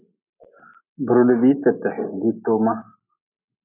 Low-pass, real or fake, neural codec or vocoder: 3.6 kHz; real; none